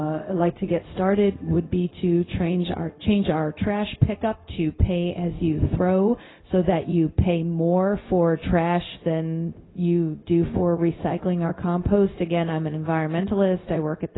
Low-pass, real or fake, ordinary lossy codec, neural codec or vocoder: 7.2 kHz; fake; AAC, 16 kbps; codec, 16 kHz, 0.4 kbps, LongCat-Audio-Codec